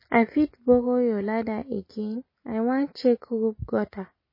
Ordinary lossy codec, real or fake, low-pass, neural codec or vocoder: MP3, 24 kbps; real; 5.4 kHz; none